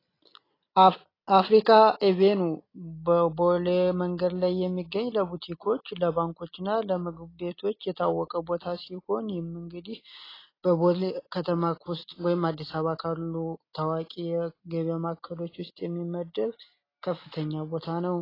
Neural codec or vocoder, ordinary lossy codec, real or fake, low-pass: none; AAC, 24 kbps; real; 5.4 kHz